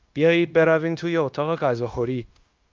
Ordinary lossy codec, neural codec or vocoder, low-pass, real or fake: Opus, 24 kbps; codec, 16 kHz, 0.9 kbps, LongCat-Audio-Codec; 7.2 kHz; fake